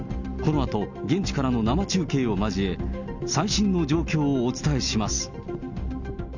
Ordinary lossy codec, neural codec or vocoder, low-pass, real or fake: none; none; 7.2 kHz; real